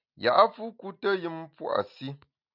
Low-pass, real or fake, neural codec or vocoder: 5.4 kHz; real; none